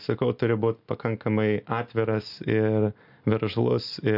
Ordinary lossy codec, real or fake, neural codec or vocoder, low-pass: AAC, 48 kbps; real; none; 5.4 kHz